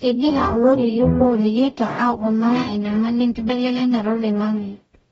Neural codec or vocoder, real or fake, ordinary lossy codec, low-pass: codec, 44.1 kHz, 0.9 kbps, DAC; fake; AAC, 24 kbps; 19.8 kHz